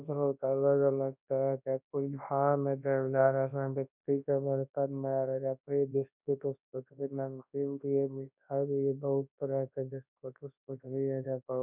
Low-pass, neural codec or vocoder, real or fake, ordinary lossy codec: 3.6 kHz; codec, 24 kHz, 0.9 kbps, WavTokenizer, large speech release; fake; none